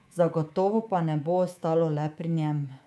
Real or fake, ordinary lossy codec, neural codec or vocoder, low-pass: fake; none; codec, 24 kHz, 3.1 kbps, DualCodec; none